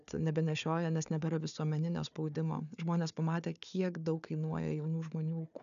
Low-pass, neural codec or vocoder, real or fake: 7.2 kHz; codec, 16 kHz, 4 kbps, FunCodec, trained on Chinese and English, 50 frames a second; fake